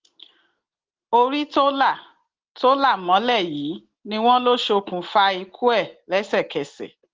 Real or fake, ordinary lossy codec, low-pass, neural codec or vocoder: real; Opus, 16 kbps; 7.2 kHz; none